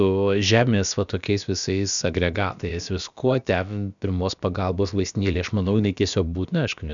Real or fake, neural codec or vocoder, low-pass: fake; codec, 16 kHz, about 1 kbps, DyCAST, with the encoder's durations; 7.2 kHz